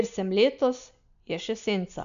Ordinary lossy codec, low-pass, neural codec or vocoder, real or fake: none; 7.2 kHz; none; real